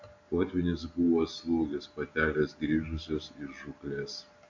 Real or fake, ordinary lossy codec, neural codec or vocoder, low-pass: real; MP3, 48 kbps; none; 7.2 kHz